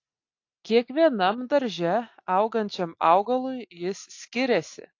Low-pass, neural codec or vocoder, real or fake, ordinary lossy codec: 7.2 kHz; none; real; AAC, 48 kbps